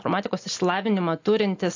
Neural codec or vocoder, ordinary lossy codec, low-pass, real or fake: none; AAC, 48 kbps; 7.2 kHz; real